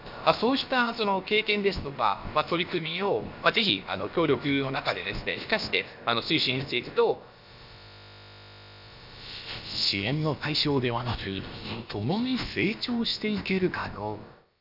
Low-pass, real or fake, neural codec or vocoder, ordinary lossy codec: 5.4 kHz; fake; codec, 16 kHz, about 1 kbps, DyCAST, with the encoder's durations; none